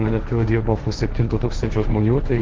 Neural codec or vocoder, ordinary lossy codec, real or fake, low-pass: codec, 16 kHz, 1.1 kbps, Voila-Tokenizer; Opus, 16 kbps; fake; 7.2 kHz